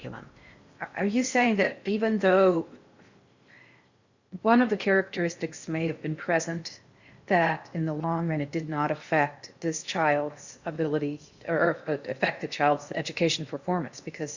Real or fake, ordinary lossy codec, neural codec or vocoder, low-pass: fake; Opus, 64 kbps; codec, 16 kHz in and 24 kHz out, 0.6 kbps, FocalCodec, streaming, 4096 codes; 7.2 kHz